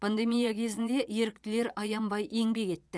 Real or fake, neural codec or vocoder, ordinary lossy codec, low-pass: fake; vocoder, 22.05 kHz, 80 mel bands, Vocos; none; none